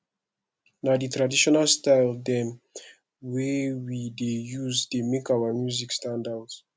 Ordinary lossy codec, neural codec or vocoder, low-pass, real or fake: none; none; none; real